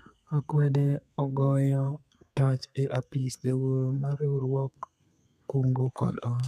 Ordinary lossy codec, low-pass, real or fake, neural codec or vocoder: none; 14.4 kHz; fake; codec, 32 kHz, 1.9 kbps, SNAC